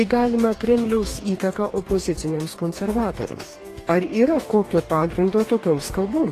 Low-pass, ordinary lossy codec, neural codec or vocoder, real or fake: 14.4 kHz; AAC, 48 kbps; codec, 44.1 kHz, 2.6 kbps, DAC; fake